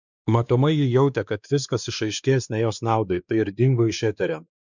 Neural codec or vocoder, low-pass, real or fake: codec, 16 kHz, 2 kbps, X-Codec, WavLM features, trained on Multilingual LibriSpeech; 7.2 kHz; fake